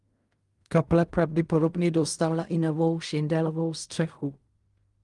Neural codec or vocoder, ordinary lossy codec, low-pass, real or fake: codec, 16 kHz in and 24 kHz out, 0.4 kbps, LongCat-Audio-Codec, fine tuned four codebook decoder; Opus, 32 kbps; 10.8 kHz; fake